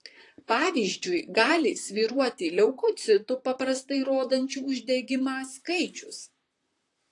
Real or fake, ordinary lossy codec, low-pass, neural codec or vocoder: fake; AAC, 48 kbps; 10.8 kHz; vocoder, 44.1 kHz, 128 mel bands, Pupu-Vocoder